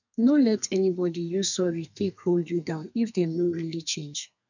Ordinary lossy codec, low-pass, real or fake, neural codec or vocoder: none; 7.2 kHz; fake; codec, 32 kHz, 1.9 kbps, SNAC